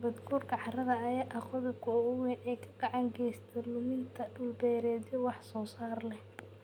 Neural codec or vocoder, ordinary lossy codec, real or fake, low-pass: none; none; real; 19.8 kHz